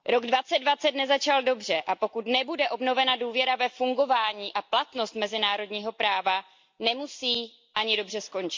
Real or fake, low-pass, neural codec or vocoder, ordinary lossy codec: real; 7.2 kHz; none; MP3, 48 kbps